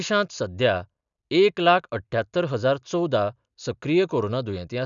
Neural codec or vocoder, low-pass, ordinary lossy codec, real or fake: none; 7.2 kHz; none; real